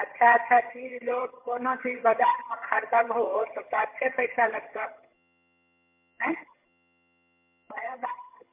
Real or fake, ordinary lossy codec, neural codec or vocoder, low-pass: fake; MP3, 32 kbps; vocoder, 22.05 kHz, 80 mel bands, HiFi-GAN; 3.6 kHz